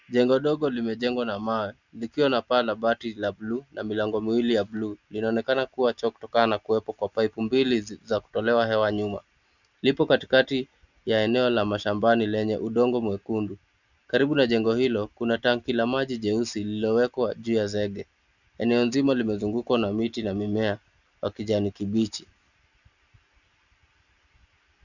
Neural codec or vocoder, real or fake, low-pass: none; real; 7.2 kHz